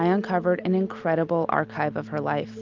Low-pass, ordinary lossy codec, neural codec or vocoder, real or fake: 7.2 kHz; Opus, 32 kbps; none; real